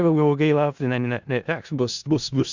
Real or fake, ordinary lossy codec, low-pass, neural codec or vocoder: fake; Opus, 64 kbps; 7.2 kHz; codec, 16 kHz in and 24 kHz out, 0.4 kbps, LongCat-Audio-Codec, four codebook decoder